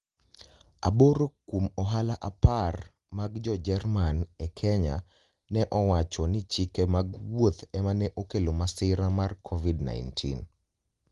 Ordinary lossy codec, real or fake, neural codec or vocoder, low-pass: Opus, 24 kbps; real; none; 10.8 kHz